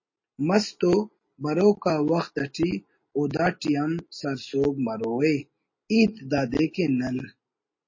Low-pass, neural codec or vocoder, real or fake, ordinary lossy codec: 7.2 kHz; none; real; MP3, 32 kbps